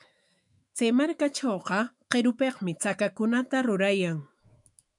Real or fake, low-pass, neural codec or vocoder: fake; 10.8 kHz; autoencoder, 48 kHz, 128 numbers a frame, DAC-VAE, trained on Japanese speech